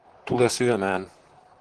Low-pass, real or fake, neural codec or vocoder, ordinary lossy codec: 10.8 kHz; fake; vocoder, 44.1 kHz, 128 mel bands, Pupu-Vocoder; Opus, 16 kbps